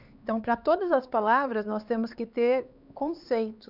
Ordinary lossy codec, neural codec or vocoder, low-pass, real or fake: none; codec, 16 kHz, 4 kbps, X-Codec, HuBERT features, trained on LibriSpeech; 5.4 kHz; fake